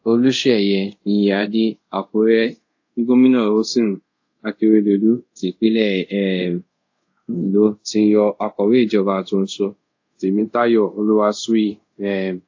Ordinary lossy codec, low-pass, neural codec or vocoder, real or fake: AAC, 48 kbps; 7.2 kHz; codec, 24 kHz, 0.5 kbps, DualCodec; fake